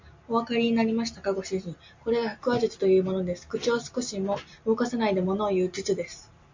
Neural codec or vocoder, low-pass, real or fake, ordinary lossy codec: none; 7.2 kHz; real; MP3, 48 kbps